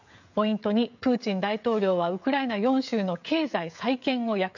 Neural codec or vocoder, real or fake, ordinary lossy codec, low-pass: codec, 16 kHz, 16 kbps, FreqCodec, smaller model; fake; none; 7.2 kHz